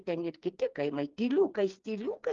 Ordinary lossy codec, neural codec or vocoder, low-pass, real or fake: Opus, 32 kbps; codec, 16 kHz, 4 kbps, FreqCodec, smaller model; 7.2 kHz; fake